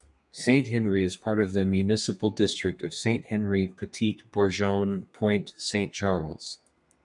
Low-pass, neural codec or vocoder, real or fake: 10.8 kHz; codec, 32 kHz, 1.9 kbps, SNAC; fake